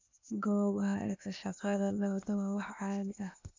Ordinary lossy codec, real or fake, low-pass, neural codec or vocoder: MP3, 64 kbps; fake; 7.2 kHz; codec, 16 kHz, 0.8 kbps, ZipCodec